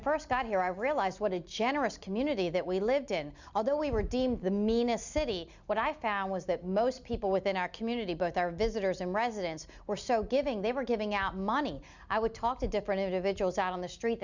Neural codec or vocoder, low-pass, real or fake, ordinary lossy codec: none; 7.2 kHz; real; Opus, 64 kbps